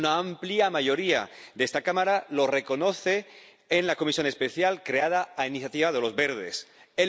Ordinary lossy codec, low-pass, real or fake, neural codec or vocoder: none; none; real; none